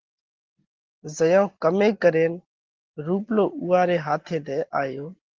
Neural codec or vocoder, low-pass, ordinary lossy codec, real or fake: none; 7.2 kHz; Opus, 16 kbps; real